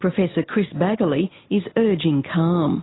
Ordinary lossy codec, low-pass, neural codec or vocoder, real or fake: AAC, 16 kbps; 7.2 kHz; none; real